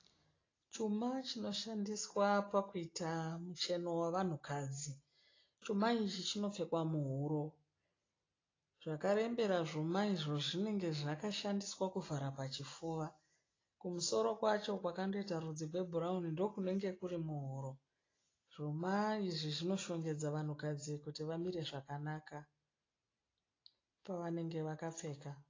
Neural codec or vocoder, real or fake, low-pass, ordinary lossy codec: none; real; 7.2 kHz; AAC, 32 kbps